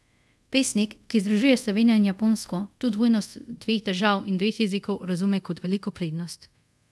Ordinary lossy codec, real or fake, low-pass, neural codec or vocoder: none; fake; none; codec, 24 kHz, 0.5 kbps, DualCodec